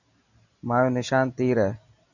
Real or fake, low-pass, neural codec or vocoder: real; 7.2 kHz; none